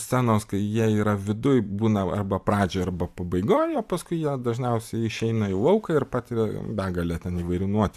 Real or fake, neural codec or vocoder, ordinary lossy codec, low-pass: fake; vocoder, 44.1 kHz, 128 mel bands every 512 samples, BigVGAN v2; AAC, 96 kbps; 14.4 kHz